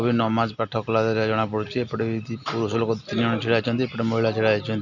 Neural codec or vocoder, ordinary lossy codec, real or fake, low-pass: none; none; real; 7.2 kHz